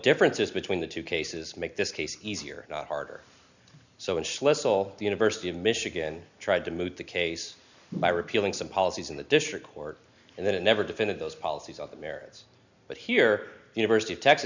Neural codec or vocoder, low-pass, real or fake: none; 7.2 kHz; real